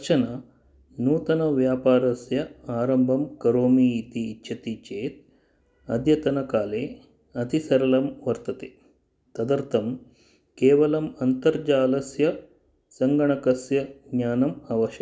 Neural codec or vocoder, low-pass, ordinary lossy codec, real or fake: none; none; none; real